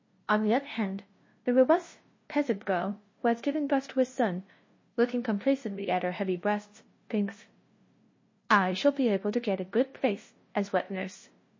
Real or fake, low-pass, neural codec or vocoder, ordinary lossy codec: fake; 7.2 kHz; codec, 16 kHz, 0.5 kbps, FunCodec, trained on LibriTTS, 25 frames a second; MP3, 32 kbps